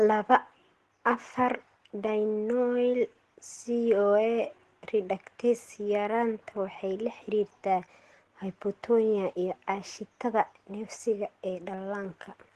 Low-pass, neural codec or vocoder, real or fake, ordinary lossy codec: 10.8 kHz; none; real; Opus, 16 kbps